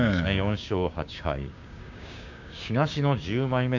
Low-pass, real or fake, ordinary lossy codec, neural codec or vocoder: 7.2 kHz; fake; none; codec, 16 kHz, 6 kbps, DAC